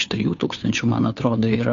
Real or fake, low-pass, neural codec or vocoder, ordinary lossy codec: fake; 7.2 kHz; codec, 16 kHz, 2 kbps, FunCodec, trained on Chinese and English, 25 frames a second; AAC, 48 kbps